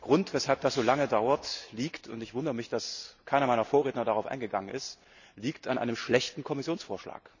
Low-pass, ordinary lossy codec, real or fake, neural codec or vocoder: 7.2 kHz; none; real; none